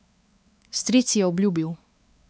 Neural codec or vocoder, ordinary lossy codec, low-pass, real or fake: codec, 16 kHz, 4 kbps, X-Codec, WavLM features, trained on Multilingual LibriSpeech; none; none; fake